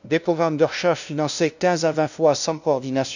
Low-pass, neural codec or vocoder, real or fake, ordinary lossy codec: 7.2 kHz; codec, 16 kHz, 0.5 kbps, FunCodec, trained on LibriTTS, 25 frames a second; fake; none